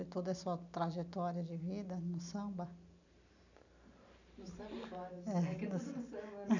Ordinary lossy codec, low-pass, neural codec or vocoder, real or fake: none; 7.2 kHz; none; real